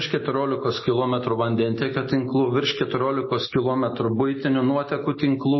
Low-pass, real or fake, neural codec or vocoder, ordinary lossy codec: 7.2 kHz; real; none; MP3, 24 kbps